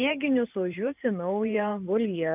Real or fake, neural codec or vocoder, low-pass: fake; vocoder, 44.1 kHz, 128 mel bands every 512 samples, BigVGAN v2; 3.6 kHz